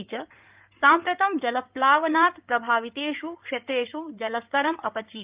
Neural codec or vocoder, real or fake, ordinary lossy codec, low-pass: codec, 16 kHz in and 24 kHz out, 2.2 kbps, FireRedTTS-2 codec; fake; Opus, 24 kbps; 3.6 kHz